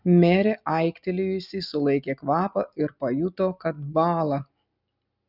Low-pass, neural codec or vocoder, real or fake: 5.4 kHz; none; real